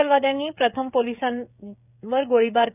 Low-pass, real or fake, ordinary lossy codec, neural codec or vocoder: 3.6 kHz; fake; none; codec, 16 kHz, 16 kbps, FreqCodec, smaller model